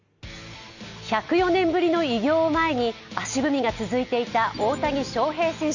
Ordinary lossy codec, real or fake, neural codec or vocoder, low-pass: none; real; none; 7.2 kHz